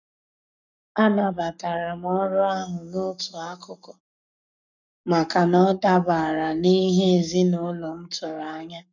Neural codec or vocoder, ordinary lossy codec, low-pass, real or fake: codec, 44.1 kHz, 7.8 kbps, Pupu-Codec; none; 7.2 kHz; fake